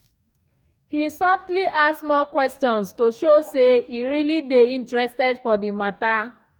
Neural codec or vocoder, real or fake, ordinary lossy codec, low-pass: codec, 44.1 kHz, 2.6 kbps, DAC; fake; none; 19.8 kHz